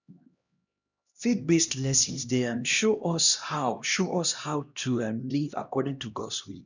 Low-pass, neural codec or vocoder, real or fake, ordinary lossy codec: 7.2 kHz; codec, 16 kHz, 1 kbps, X-Codec, HuBERT features, trained on LibriSpeech; fake; none